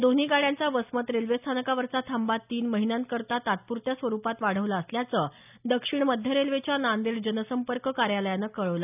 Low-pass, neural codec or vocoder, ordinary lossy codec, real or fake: 3.6 kHz; none; none; real